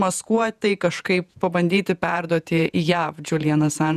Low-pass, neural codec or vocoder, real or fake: 14.4 kHz; vocoder, 48 kHz, 128 mel bands, Vocos; fake